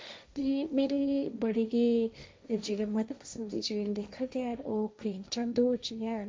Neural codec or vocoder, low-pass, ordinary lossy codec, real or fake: codec, 16 kHz, 1.1 kbps, Voila-Tokenizer; none; none; fake